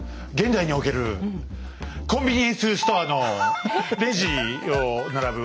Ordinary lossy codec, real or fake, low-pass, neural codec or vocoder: none; real; none; none